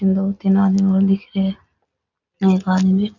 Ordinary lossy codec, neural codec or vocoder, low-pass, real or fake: none; none; 7.2 kHz; real